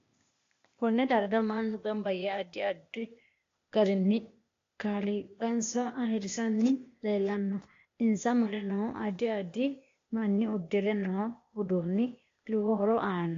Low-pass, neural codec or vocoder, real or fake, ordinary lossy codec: 7.2 kHz; codec, 16 kHz, 0.8 kbps, ZipCodec; fake; AAC, 48 kbps